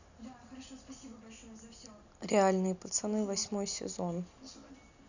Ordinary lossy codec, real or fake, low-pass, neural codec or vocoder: none; real; 7.2 kHz; none